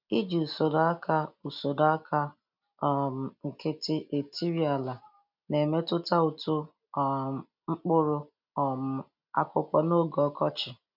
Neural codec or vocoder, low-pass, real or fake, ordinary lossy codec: none; 5.4 kHz; real; none